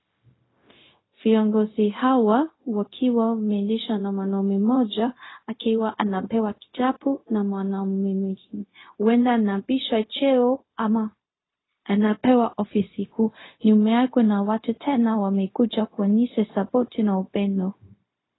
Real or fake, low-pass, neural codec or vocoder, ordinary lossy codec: fake; 7.2 kHz; codec, 16 kHz, 0.4 kbps, LongCat-Audio-Codec; AAC, 16 kbps